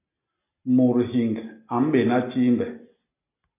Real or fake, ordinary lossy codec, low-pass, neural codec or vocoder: real; AAC, 24 kbps; 3.6 kHz; none